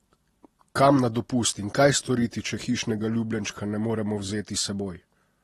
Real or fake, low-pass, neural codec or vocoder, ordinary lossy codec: real; 19.8 kHz; none; AAC, 32 kbps